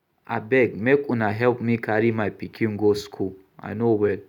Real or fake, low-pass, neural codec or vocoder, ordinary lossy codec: real; 19.8 kHz; none; none